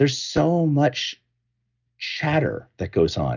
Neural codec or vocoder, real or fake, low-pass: none; real; 7.2 kHz